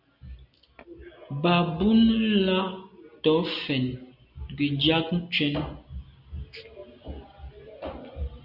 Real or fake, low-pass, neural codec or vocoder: real; 5.4 kHz; none